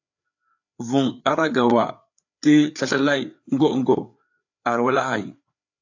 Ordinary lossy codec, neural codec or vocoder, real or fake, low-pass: AAC, 48 kbps; codec, 16 kHz, 4 kbps, FreqCodec, larger model; fake; 7.2 kHz